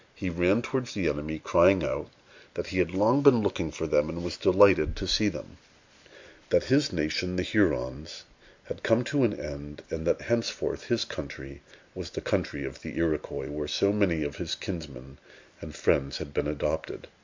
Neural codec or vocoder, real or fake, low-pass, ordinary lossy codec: none; real; 7.2 kHz; MP3, 64 kbps